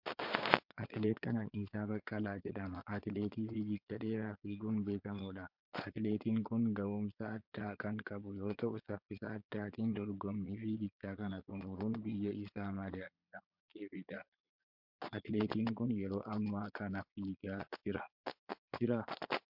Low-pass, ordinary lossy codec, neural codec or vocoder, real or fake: 5.4 kHz; MP3, 48 kbps; vocoder, 22.05 kHz, 80 mel bands, Vocos; fake